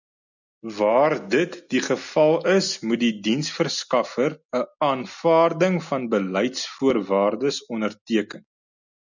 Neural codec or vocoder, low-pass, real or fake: none; 7.2 kHz; real